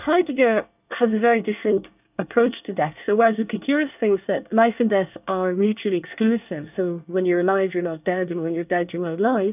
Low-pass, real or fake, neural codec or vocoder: 3.6 kHz; fake; codec, 24 kHz, 1 kbps, SNAC